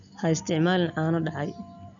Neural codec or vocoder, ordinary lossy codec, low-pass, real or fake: none; none; 7.2 kHz; real